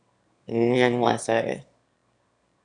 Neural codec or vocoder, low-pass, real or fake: autoencoder, 22.05 kHz, a latent of 192 numbers a frame, VITS, trained on one speaker; 9.9 kHz; fake